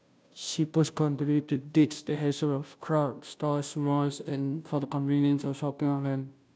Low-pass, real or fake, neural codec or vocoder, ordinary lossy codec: none; fake; codec, 16 kHz, 0.5 kbps, FunCodec, trained on Chinese and English, 25 frames a second; none